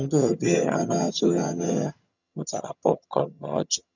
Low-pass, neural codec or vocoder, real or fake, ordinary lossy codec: 7.2 kHz; vocoder, 22.05 kHz, 80 mel bands, HiFi-GAN; fake; none